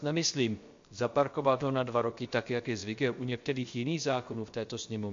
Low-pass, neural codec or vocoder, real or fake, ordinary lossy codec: 7.2 kHz; codec, 16 kHz, 0.7 kbps, FocalCodec; fake; MP3, 48 kbps